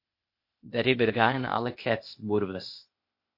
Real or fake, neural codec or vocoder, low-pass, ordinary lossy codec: fake; codec, 16 kHz, 0.8 kbps, ZipCodec; 5.4 kHz; MP3, 32 kbps